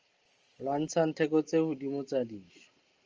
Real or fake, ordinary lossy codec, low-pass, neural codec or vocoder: real; Opus, 24 kbps; 7.2 kHz; none